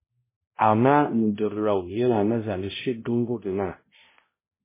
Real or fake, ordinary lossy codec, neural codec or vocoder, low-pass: fake; MP3, 16 kbps; codec, 16 kHz, 0.5 kbps, X-Codec, HuBERT features, trained on balanced general audio; 3.6 kHz